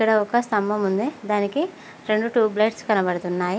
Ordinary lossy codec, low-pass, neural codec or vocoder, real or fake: none; none; none; real